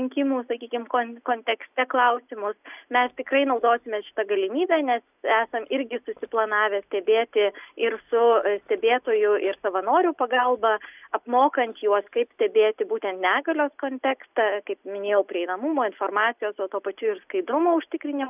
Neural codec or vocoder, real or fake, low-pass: none; real; 3.6 kHz